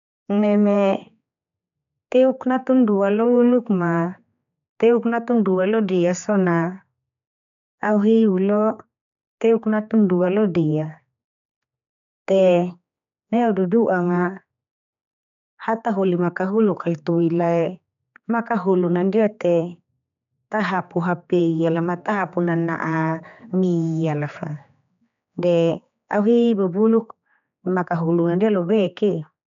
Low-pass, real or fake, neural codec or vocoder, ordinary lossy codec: 7.2 kHz; fake; codec, 16 kHz, 4 kbps, X-Codec, HuBERT features, trained on general audio; none